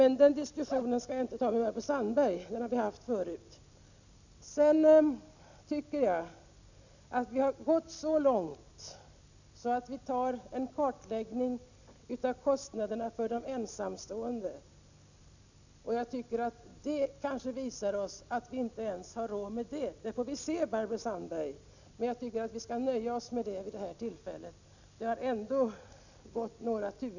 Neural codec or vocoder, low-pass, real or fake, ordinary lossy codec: none; 7.2 kHz; real; none